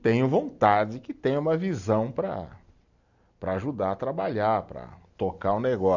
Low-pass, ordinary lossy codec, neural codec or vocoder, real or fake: 7.2 kHz; none; none; real